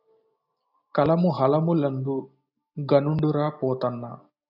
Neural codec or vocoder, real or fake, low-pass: none; real; 5.4 kHz